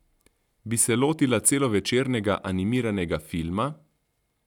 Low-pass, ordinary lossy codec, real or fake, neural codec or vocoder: 19.8 kHz; none; real; none